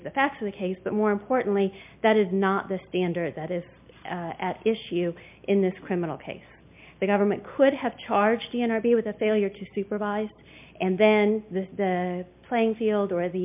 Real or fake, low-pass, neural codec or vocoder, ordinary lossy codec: real; 3.6 kHz; none; MP3, 32 kbps